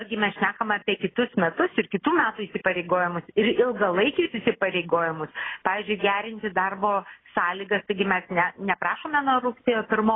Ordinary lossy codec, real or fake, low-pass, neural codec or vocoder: AAC, 16 kbps; real; 7.2 kHz; none